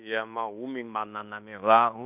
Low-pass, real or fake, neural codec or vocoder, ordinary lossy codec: 3.6 kHz; fake; codec, 16 kHz in and 24 kHz out, 0.9 kbps, LongCat-Audio-Codec, fine tuned four codebook decoder; none